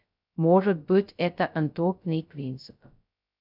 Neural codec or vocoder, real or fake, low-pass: codec, 16 kHz, 0.2 kbps, FocalCodec; fake; 5.4 kHz